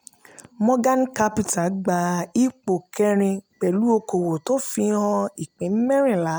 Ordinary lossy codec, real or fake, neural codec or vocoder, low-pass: none; real; none; none